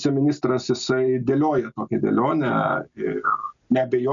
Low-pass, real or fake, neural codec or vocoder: 7.2 kHz; real; none